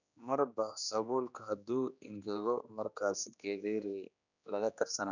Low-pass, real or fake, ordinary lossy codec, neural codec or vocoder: 7.2 kHz; fake; none; codec, 16 kHz, 2 kbps, X-Codec, HuBERT features, trained on general audio